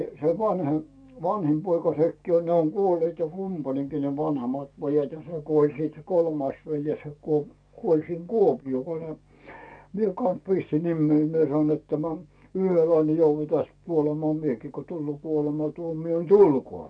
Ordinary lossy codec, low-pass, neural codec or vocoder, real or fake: none; 9.9 kHz; codec, 44.1 kHz, 7.8 kbps, DAC; fake